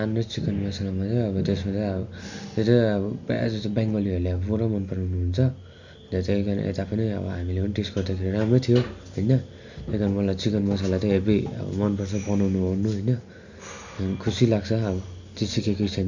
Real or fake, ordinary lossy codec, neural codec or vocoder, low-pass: real; Opus, 64 kbps; none; 7.2 kHz